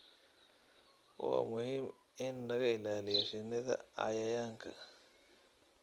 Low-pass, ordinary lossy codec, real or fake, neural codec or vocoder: 19.8 kHz; Opus, 24 kbps; real; none